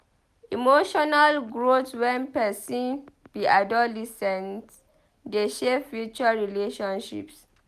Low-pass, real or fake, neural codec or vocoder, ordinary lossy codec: 14.4 kHz; real; none; none